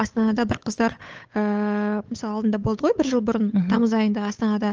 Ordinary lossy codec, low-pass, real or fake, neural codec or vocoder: Opus, 24 kbps; 7.2 kHz; fake; codec, 16 kHz, 8 kbps, FunCodec, trained on Chinese and English, 25 frames a second